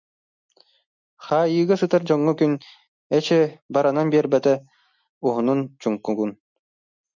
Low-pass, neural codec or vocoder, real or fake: 7.2 kHz; none; real